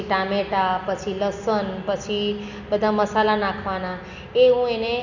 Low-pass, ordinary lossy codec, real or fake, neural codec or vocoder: 7.2 kHz; none; real; none